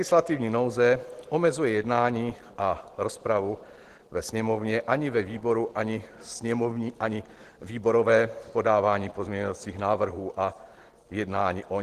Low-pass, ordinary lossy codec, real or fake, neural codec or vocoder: 14.4 kHz; Opus, 16 kbps; real; none